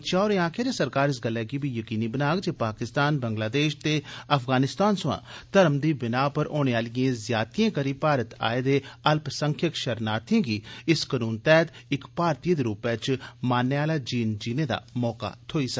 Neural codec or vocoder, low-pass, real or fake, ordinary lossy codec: none; none; real; none